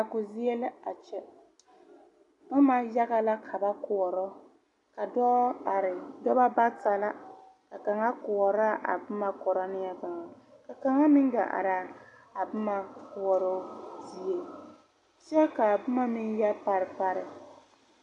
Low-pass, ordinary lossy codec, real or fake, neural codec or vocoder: 10.8 kHz; AAC, 48 kbps; real; none